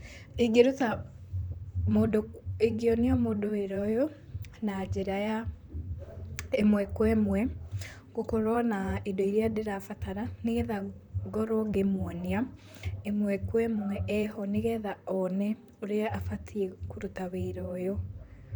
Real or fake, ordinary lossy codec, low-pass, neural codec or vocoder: fake; none; none; vocoder, 44.1 kHz, 128 mel bands, Pupu-Vocoder